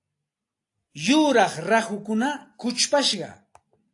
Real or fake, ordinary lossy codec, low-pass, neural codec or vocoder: real; AAC, 48 kbps; 10.8 kHz; none